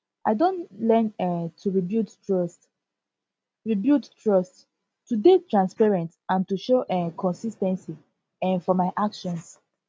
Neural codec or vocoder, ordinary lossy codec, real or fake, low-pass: none; none; real; none